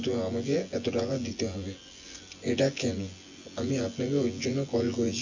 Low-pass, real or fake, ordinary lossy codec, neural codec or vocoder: 7.2 kHz; fake; MP3, 48 kbps; vocoder, 24 kHz, 100 mel bands, Vocos